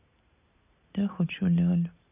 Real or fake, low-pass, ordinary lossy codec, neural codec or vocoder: real; 3.6 kHz; none; none